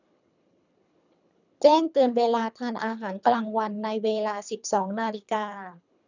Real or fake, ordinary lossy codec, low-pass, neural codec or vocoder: fake; none; 7.2 kHz; codec, 24 kHz, 3 kbps, HILCodec